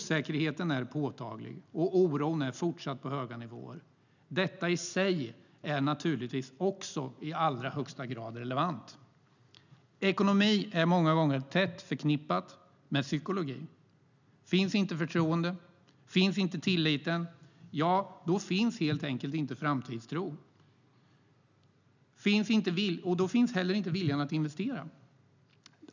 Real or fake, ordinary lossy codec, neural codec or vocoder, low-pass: real; none; none; 7.2 kHz